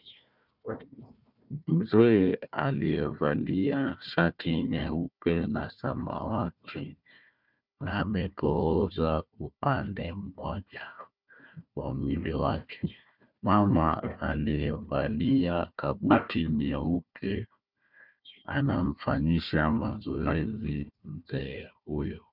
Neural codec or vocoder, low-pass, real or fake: codec, 16 kHz, 1 kbps, FunCodec, trained on Chinese and English, 50 frames a second; 5.4 kHz; fake